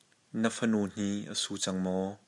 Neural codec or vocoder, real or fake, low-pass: none; real; 10.8 kHz